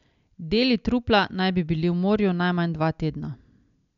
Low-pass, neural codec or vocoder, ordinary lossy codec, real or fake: 7.2 kHz; none; none; real